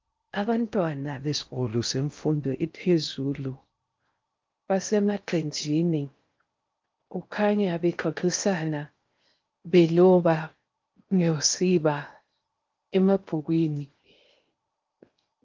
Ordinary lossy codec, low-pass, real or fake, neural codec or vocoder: Opus, 24 kbps; 7.2 kHz; fake; codec, 16 kHz in and 24 kHz out, 0.6 kbps, FocalCodec, streaming, 4096 codes